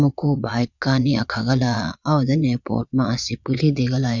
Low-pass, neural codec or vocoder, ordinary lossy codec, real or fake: 7.2 kHz; vocoder, 22.05 kHz, 80 mel bands, Vocos; none; fake